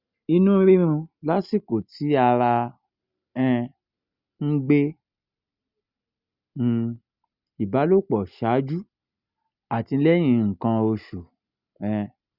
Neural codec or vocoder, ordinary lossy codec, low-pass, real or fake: none; Opus, 64 kbps; 5.4 kHz; real